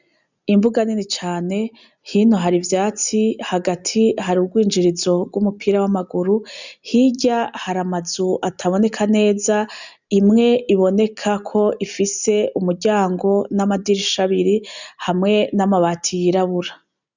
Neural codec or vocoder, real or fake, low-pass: none; real; 7.2 kHz